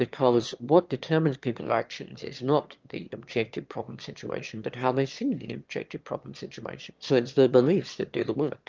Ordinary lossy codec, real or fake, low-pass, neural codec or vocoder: Opus, 24 kbps; fake; 7.2 kHz; autoencoder, 22.05 kHz, a latent of 192 numbers a frame, VITS, trained on one speaker